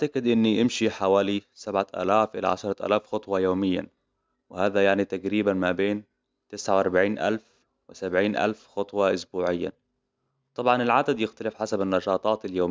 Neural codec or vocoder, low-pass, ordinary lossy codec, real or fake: none; none; none; real